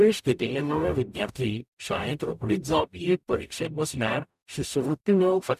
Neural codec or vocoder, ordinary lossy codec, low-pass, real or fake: codec, 44.1 kHz, 0.9 kbps, DAC; none; 14.4 kHz; fake